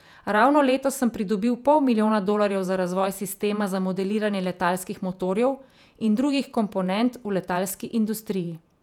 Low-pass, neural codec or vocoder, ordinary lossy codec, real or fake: 19.8 kHz; vocoder, 48 kHz, 128 mel bands, Vocos; none; fake